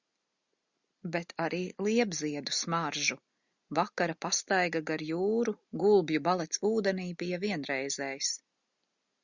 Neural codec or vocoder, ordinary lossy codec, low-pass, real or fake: none; Opus, 64 kbps; 7.2 kHz; real